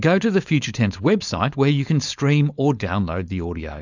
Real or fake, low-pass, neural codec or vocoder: fake; 7.2 kHz; codec, 16 kHz, 4.8 kbps, FACodec